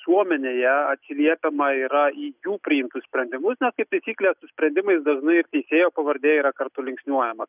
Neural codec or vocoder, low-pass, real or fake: none; 3.6 kHz; real